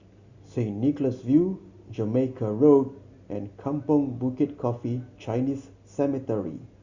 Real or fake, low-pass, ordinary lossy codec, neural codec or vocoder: real; 7.2 kHz; Opus, 64 kbps; none